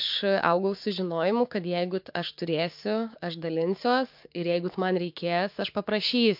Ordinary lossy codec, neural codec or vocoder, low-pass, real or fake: MP3, 48 kbps; codec, 16 kHz, 6 kbps, DAC; 5.4 kHz; fake